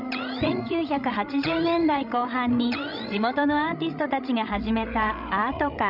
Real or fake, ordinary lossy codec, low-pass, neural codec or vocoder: fake; none; 5.4 kHz; codec, 16 kHz, 16 kbps, FreqCodec, larger model